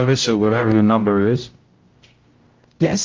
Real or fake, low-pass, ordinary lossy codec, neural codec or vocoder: fake; 7.2 kHz; Opus, 24 kbps; codec, 16 kHz, 0.5 kbps, X-Codec, HuBERT features, trained on general audio